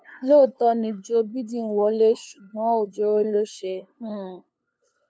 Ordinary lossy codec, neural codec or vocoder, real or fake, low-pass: none; codec, 16 kHz, 2 kbps, FunCodec, trained on LibriTTS, 25 frames a second; fake; none